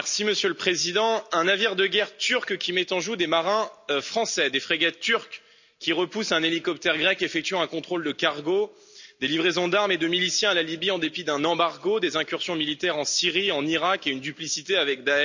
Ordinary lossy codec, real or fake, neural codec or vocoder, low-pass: none; real; none; 7.2 kHz